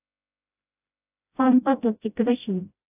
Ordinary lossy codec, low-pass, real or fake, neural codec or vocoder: AAC, 32 kbps; 3.6 kHz; fake; codec, 16 kHz, 0.5 kbps, FreqCodec, smaller model